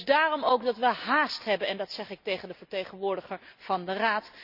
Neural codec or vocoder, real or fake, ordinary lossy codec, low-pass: none; real; none; 5.4 kHz